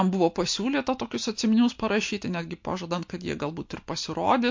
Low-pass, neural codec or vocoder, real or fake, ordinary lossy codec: 7.2 kHz; none; real; MP3, 48 kbps